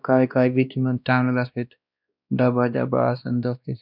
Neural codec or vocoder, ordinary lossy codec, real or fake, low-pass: codec, 16 kHz, 1 kbps, X-Codec, WavLM features, trained on Multilingual LibriSpeech; none; fake; 5.4 kHz